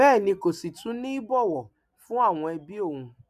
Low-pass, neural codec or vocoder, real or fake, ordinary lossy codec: 14.4 kHz; none; real; none